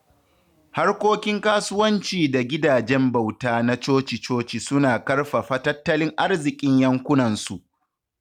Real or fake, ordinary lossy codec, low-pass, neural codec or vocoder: real; none; 19.8 kHz; none